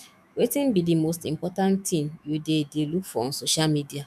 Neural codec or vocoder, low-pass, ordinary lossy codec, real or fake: autoencoder, 48 kHz, 128 numbers a frame, DAC-VAE, trained on Japanese speech; 14.4 kHz; none; fake